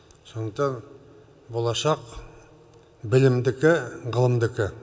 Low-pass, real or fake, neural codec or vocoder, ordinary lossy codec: none; real; none; none